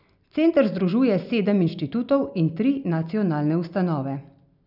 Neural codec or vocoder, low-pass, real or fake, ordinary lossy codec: none; 5.4 kHz; real; none